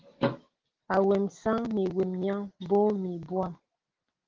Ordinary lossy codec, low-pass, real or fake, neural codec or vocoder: Opus, 16 kbps; 7.2 kHz; real; none